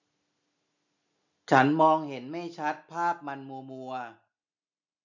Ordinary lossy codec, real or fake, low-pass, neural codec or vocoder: none; real; 7.2 kHz; none